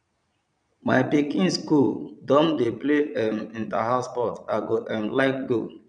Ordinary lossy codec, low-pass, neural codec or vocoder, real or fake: none; 9.9 kHz; vocoder, 22.05 kHz, 80 mel bands, Vocos; fake